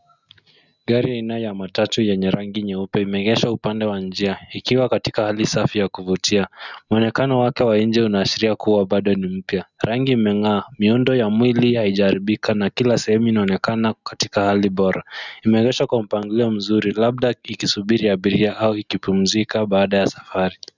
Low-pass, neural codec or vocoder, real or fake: 7.2 kHz; none; real